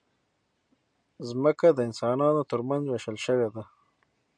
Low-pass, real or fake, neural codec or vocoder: 9.9 kHz; real; none